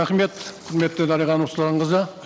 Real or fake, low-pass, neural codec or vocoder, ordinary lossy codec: real; none; none; none